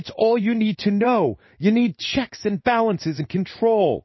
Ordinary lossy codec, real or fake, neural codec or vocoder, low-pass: MP3, 24 kbps; fake; codec, 16 kHz in and 24 kHz out, 1 kbps, XY-Tokenizer; 7.2 kHz